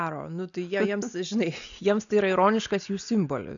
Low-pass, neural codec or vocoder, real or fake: 7.2 kHz; none; real